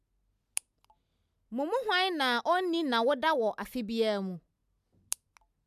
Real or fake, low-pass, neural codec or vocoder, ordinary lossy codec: real; 14.4 kHz; none; none